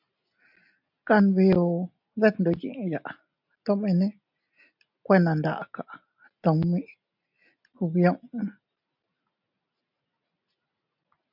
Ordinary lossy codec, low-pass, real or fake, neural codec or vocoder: MP3, 48 kbps; 5.4 kHz; real; none